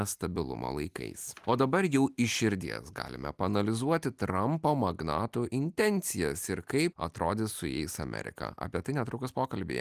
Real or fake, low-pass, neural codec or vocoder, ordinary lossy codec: real; 14.4 kHz; none; Opus, 32 kbps